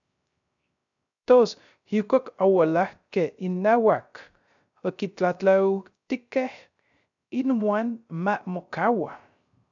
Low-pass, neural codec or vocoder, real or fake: 7.2 kHz; codec, 16 kHz, 0.3 kbps, FocalCodec; fake